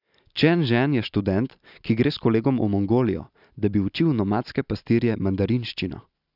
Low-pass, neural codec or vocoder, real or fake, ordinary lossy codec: 5.4 kHz; none; real; none